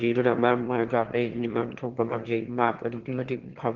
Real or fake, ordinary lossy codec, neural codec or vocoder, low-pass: fake; Opus, 16 kbps; autoencoder, 22.05 kHz, a latent of 192 numbers a frame, VITS, trained on one speaker; 7.2 kHz